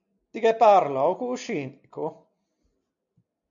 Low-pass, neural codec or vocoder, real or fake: 7.2 kHz; none; real